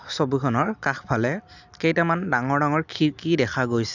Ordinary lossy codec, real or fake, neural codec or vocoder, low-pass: none; real; none; 7.2 kHz